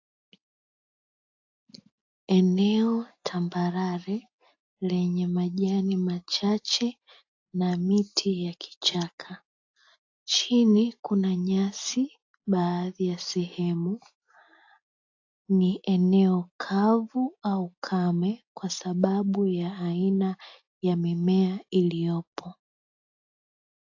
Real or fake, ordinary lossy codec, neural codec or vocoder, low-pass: real; AAC, 48 kbps; none; 7.2 kHz